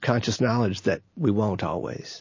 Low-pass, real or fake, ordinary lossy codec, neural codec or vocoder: 7.2 kHz; real; MP3, 32 kbps; none